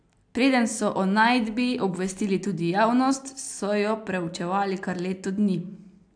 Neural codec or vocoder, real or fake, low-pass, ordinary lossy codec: none; real; 9.9 kHz; AAC, 64 kbps